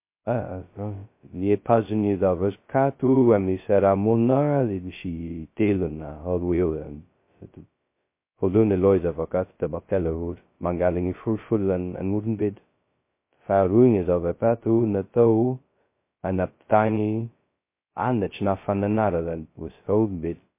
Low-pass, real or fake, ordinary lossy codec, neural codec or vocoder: 3.6 kHz; fake; MP3, 24 kbps; codec, 16 kHz, 0.2 kbps, FocalCodec